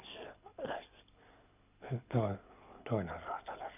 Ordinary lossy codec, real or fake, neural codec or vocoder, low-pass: none; fake; codec, 24 kHz, 6 kbps, HILCodec; 3.6 kHz